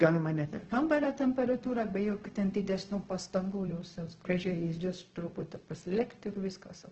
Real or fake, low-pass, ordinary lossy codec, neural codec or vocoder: fake; 7.2 kHz; Opus, 16 kbps; codec, 16 kHz, 0.4 kbps, LongCat-Audio-Codec